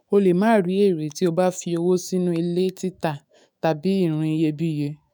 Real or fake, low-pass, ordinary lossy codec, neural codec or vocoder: fake; none; none; autoencoder, 48 kHz, 128 numbers a frame, DAC-VAE, trained on Japanese speech